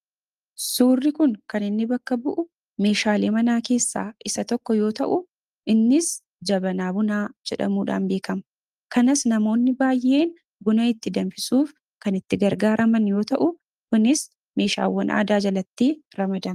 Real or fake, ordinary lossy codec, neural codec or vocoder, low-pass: real; Opus, 32 kbps; none; 14.4 kHz